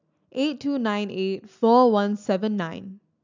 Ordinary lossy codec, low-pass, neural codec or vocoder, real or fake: none; 7.2 kHz; none; real